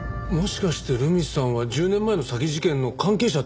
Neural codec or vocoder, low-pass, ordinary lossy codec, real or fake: none; none; none; real